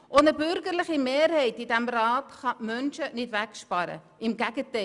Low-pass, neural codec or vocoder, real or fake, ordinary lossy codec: 10.8 kHz; none; real; none